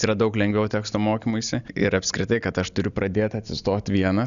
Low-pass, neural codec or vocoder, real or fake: 7.2 kHz; none; real